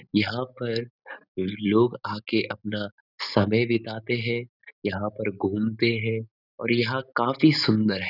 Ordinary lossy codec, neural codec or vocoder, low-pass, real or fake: none; none; 5.4 kHz; real